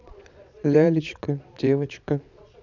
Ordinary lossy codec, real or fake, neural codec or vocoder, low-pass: none; fake; vocoder, 22.05 kHz, 80 mel bands, Vocos; 7.2 kHz